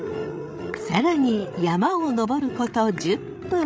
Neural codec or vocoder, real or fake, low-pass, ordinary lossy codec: codec, 16 kHz, 16 kbps, FreqCodec, larger model; fake; none; none